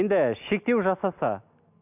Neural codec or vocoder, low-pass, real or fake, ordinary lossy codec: none; 3.6 kHz; real; none